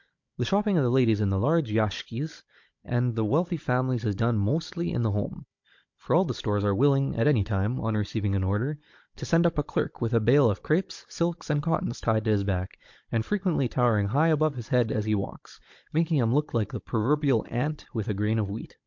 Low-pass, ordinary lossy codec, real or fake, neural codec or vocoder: 7.2 kHz; MP3, 48 kbps; fake; codec, 16 kHz, 16 kbps, FunCodec, trained on Chinese and English, 50 frames a second